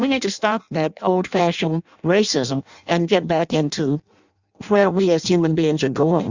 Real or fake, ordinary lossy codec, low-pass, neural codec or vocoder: fake; Opus, 64 kbps; 7.2 kHz; codec, 16 kHz in and 24 kHz out, 0.6 kbps, FireRedTTS-2 codec